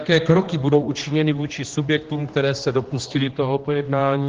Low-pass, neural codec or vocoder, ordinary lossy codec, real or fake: 7.2 kHz; codec, 16 kHz, 2 kbps, X-Codec, HuBERT features, trained on general audio; Opus, 16 kbps; fake